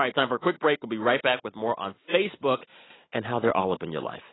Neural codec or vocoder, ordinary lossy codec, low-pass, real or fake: none; AAC, 16 kbps; 7.2 kHz; real